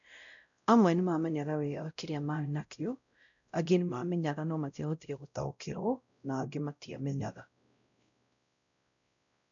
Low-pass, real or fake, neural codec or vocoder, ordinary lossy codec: 7.2 kHz; fake; codec, 16 kHz, 0.5 kbps, X-Codec, WavLM features, trained on Multilingual LibriSpeech; none